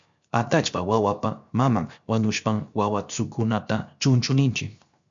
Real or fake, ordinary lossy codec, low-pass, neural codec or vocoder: fake; MP3, 64 kbps; 7.2 kHz; codec, 16 kHz, 0.7 kbps, FocalCodec